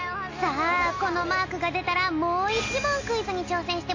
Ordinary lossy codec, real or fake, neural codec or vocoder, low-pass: AAC, 32 kbps; real; none; 7.2 kHz